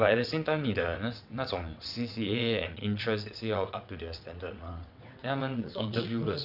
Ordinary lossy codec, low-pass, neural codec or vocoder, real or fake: none; 5.4 kHz; vocoder, 22.05 kHz, 80 mel bands, WaveNeXt; fake